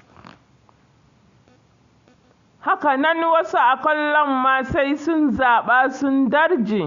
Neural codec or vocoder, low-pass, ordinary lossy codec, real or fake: none; 7.2 kHz; Opus, 64 kbps; real